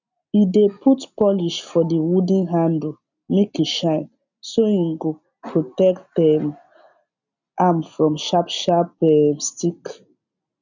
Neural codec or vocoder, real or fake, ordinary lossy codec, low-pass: none; real; none; 7.2 kHz